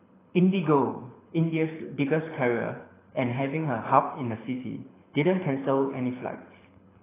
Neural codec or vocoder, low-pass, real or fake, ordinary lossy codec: codec, 24 kHz, 6 kbps, HILCodec; 3.6 kHz; fake; AAC, 16 kbps